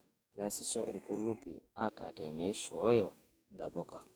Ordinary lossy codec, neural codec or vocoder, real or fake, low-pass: none; codec, 44.1 kHz, 2.6 kbps, DAC; fake; none